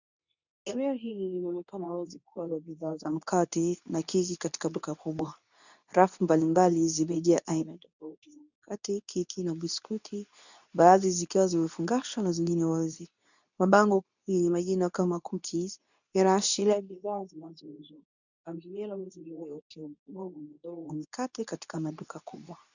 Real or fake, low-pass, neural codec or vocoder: fake; 7.2 kHz; codec, 24 kHz, 0.9 kbps, WavTokenizer, medium speech release version 2